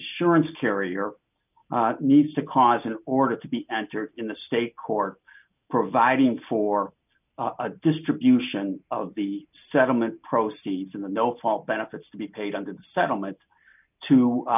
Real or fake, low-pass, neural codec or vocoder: real; 3.6 kHz; none